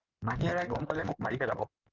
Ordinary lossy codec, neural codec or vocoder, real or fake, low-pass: Opus, 24 kbps; codec, 16 kHz in and 24 kHz out, 2.2 kbps, FireRedTTS-2 codec; fake; 7.2 kHz